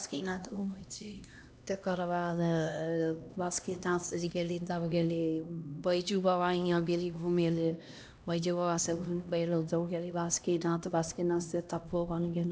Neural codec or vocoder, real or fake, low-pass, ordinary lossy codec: codec, 16 kHz, 1 kbps, X-Codec, HuBERT features, trained on LibriSpeech; fake; none; none